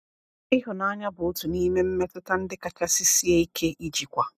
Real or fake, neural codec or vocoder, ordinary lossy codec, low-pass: real; none; none; 14.4 kHz